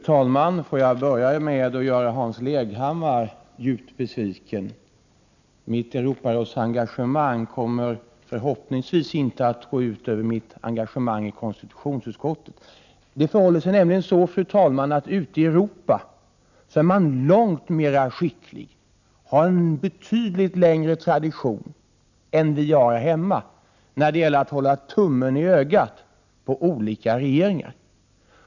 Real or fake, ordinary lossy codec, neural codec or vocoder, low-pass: real; none; none; 7.2 kHz